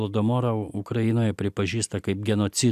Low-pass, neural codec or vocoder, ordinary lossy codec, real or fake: 14.4 kHz; none; AAC, 96 kbps; real